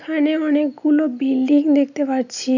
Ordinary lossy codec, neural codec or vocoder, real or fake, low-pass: none; none; real; 7.2 kHz